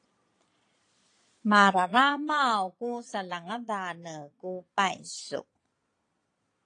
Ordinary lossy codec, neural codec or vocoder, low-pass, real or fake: AAC, 48 kbps; vocoder, 22.05 kHz, 80 mel bands, Vocos; 9.9 kHz; fake